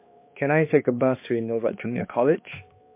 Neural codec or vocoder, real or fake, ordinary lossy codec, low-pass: codec, 16 kHz, 2 kbps, X-Codec, HuBERT features, trained on balanced general audio; fake; MP3, 24 kbps; 3.6 kHz